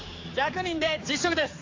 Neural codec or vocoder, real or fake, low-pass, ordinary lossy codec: codec, 16 kHz, 4 kbps, X-Codec, HuBERT features, trained on general audio; fake; 7.2 kHz; AAC, 48 kbps